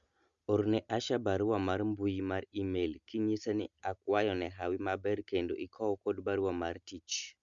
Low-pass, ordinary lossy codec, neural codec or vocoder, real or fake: 7.2 kHz; Opus, 64 kbps; none; real